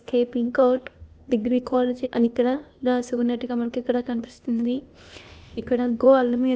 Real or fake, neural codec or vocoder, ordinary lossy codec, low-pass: fake; codec, 16 kHz, 0.8 kbps, ZipCodec; none; none